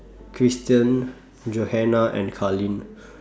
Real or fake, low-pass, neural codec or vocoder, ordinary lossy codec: real; none; none; none